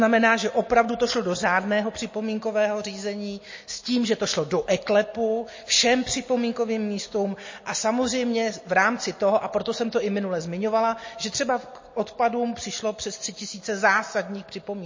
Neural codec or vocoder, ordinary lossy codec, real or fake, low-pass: none; MP3, 32 kbps; real; 7.2 kHz